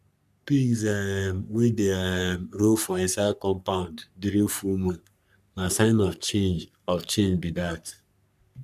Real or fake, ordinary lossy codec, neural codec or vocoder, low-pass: fake; none; codec, 44.1 kHz, 3.4 kbps, Pupu-Codec; 14.4 kHz